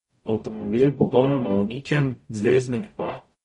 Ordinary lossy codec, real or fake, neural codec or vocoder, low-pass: MP3, 48 kbps; fake; codec, 44.1 kHz, 0.9 kbps, DAC; 19.8 kHz